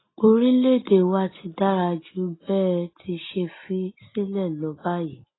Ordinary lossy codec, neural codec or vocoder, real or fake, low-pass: AAC, 16 kbps; none; real; 7.2 kHz